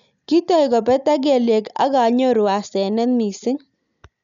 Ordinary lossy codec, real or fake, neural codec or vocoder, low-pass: none; real; none; 7.2 kHz